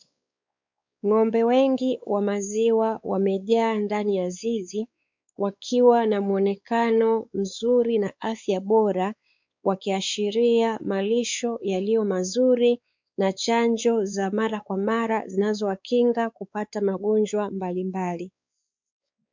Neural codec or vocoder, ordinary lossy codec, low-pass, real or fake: codec, 16 kHz, 4 kbps, X-Codec, WavLM features, trained on Multilingual LibriSpeech; MP3, 64 kbps; 7.2 kHz; fake